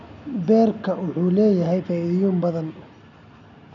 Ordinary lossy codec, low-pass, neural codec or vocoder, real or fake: none; 7.2 kHz; none; real